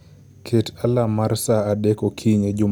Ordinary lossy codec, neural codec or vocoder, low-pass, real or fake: none; none; none; real